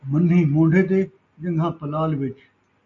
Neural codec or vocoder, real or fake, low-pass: none; real; 7.2 kHz